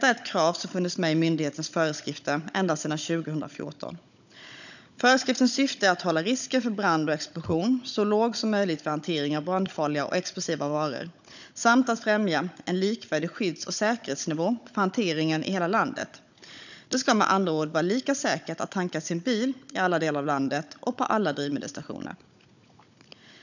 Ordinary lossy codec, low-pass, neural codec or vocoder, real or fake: none; 7.2 kHz; codec, 16 kHz, 16 kbps, FunCodec, trained on Chinese and English, 50 frames a second; fake